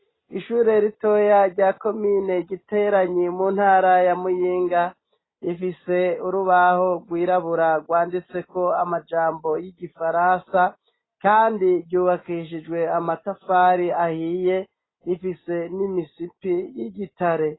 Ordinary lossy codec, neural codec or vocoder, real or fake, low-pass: AAC, 16 kbps; none; real; 7.2 kHz